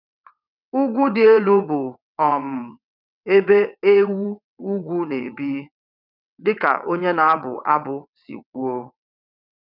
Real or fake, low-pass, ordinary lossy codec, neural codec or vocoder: fake; 5.4 kHz; none; vocoder, 22.05 kHz, 80 mel bands, WaveNeXt